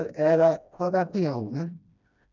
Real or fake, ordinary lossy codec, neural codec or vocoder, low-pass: fake; none; codec, 16 kHz, 1 kbps, FreqCodec, smaller model; 7.2 kHz